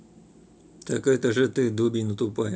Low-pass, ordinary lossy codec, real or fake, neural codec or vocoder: none; none; real; none